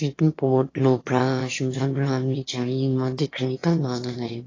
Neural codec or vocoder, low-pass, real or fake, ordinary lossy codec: autoencoder, 22.05 kHz, a latent of 192 numbers a frame, VITS, trained on one speaker; 7.2 kHz; fake; AAC, 32 kbps